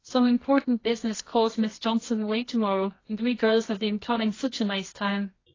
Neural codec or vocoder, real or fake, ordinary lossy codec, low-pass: codec, 24 kHz, 0.9 kbps, WavTokenizer, medium music audio release; fake; AAC, 32 kbps; 7.2 kHz